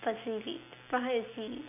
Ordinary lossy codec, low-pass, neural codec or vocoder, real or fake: none; 3.6 kHz; none; real